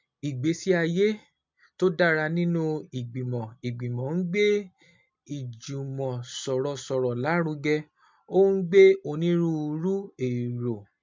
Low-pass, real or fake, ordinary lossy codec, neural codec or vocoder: 7.2 kHz; real; MP3, 64 kbps; none